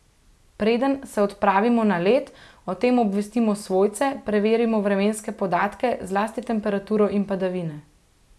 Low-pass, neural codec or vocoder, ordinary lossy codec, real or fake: none; none; none; real